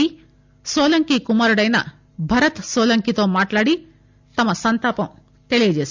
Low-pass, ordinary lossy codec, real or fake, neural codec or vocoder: 7.2 kHz; MP3, 64 kbps; real; none